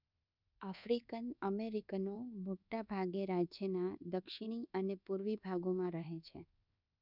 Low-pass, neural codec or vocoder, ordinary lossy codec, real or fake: 5.4 kHz; codec, 24 kHz, 1.2 kbps, DualCodec; none; fake